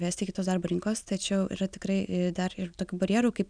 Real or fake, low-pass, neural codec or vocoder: real; 9.9 kHz; none